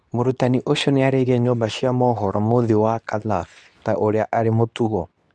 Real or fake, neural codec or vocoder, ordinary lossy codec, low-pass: fake; codec, 24 kHz, 0.9 kbps, WavTokenizer, medium speech release version 2; none; none